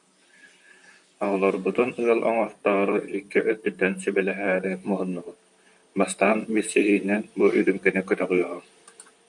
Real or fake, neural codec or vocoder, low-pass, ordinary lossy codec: fake; vocoder, 44.1 kHz, 128 mel bands, Pupu-Vocoder; 10.8 kHz; MP3, 64 kbps